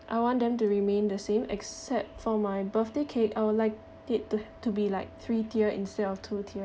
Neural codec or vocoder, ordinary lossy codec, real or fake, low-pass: none; none; real; none